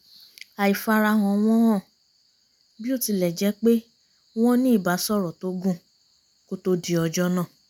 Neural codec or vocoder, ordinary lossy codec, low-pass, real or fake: none; none; 19.8 kHz; real